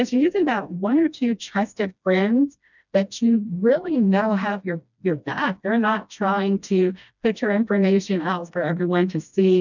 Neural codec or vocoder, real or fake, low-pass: codec, 16 kHz, 1 kbps, FreqCodec, smaller model; fake; 7.2 kHz